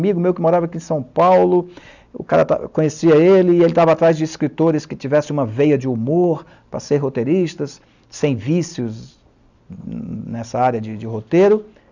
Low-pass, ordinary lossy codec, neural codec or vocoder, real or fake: 7.2 kHz; none; none; real